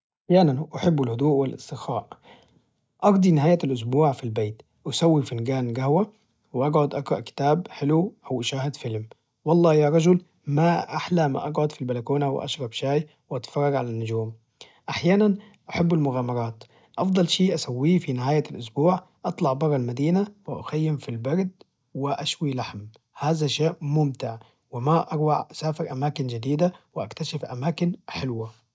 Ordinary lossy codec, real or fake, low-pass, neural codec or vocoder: none; real; none; none